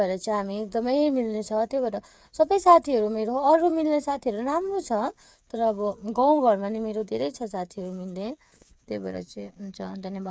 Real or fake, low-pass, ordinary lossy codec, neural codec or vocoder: fake; none; none; codec, 16 kHz, 8 kbps, FreqCodec, smaller model